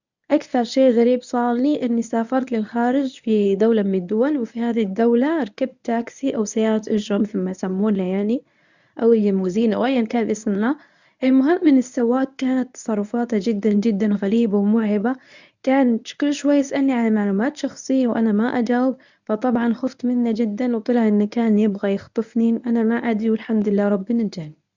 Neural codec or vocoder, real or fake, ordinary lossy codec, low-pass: codec, 24 kHz, 0.9 kbps, WavTokenizer, medium speech release version 1; fake; none; 7.2 kHz